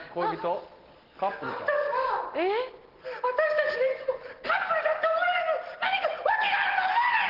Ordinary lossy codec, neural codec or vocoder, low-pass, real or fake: Opus, 16 kbps; none; 5.4 kHz; real